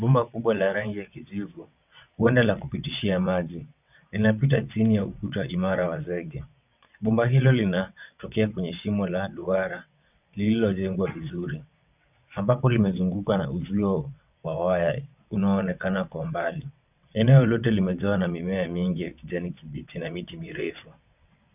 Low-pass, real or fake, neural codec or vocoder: 3.6 kHz; fake; vocoder, 22.05 kHz, 80 mel bands, WaveNeXt